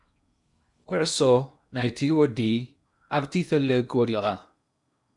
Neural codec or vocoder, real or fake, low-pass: codec, 16 kHz in and 24 kHz out, 0.8 kbps, FocalCodec, streaming, 65536 codes; fake; 10.8 kHz